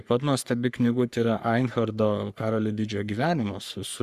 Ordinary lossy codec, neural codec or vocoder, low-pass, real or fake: Opus, 64 kbps; codec, 44.1 kHz, 3.4 kbps, Pupu-Codec; 14.4 kHz; fake